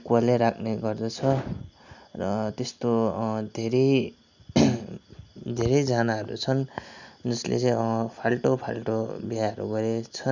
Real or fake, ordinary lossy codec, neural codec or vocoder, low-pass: real; none; none; 7.2 kHz